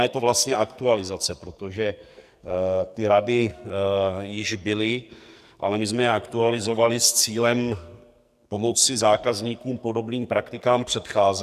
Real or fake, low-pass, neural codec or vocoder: fake; 14.4 kHz; codec, 44.1 kHz, 2.6 kbps, SNAC